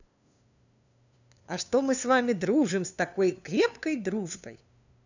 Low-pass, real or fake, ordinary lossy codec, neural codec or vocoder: 7.2 kHz; fake; none; codec, 16 kHz, 2 kbps, FunCodec, trained on LibriTTS, 25 frames a second